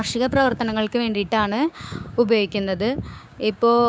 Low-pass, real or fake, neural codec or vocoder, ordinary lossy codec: none; fake; codec, 16 kHz, 6 kbps, DAC; none